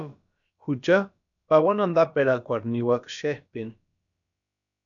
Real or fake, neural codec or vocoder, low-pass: fake; codec, 16 kHz, about 1 kbps, DyCAST, with the encoder's durations; 7.2 kHz